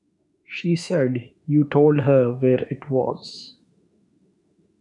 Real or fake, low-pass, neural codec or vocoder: fake; 10.8 kHz; autoencoder, 48 kHz, 32 numbers a frame, DAC-VAE, trained on Japanese speech